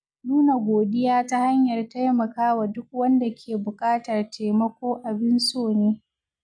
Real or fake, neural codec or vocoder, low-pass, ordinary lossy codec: real; none; 9.9 kHz; none